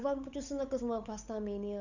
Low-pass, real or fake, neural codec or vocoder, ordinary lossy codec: 7.2 kHz; fake; codec, 16 kHz, 8 kbps, FunCodec, trained on Chinese and English, 25 frames a second; none